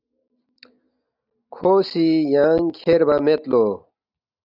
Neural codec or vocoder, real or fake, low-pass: none; real; 5.4 kHz